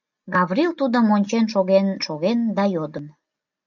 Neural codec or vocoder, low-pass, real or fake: none; 7.2 kHz; real